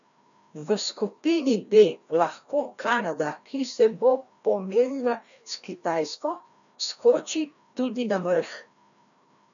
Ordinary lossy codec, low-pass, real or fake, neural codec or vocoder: none; 7.2 kHz; fake; codec, 16 kHz, 1 kbps, FreqCodec, larger model